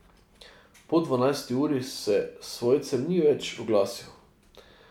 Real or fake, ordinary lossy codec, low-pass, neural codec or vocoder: real; none; 19.8 kHz; none